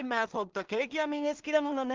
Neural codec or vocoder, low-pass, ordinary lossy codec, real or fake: codec, 16 kHz in and 24 kHz out, 0.4 kbps, LongCat-Audio-Codec, two codebook decoder; 7.2 kHz; Opus, 24 kbps; fake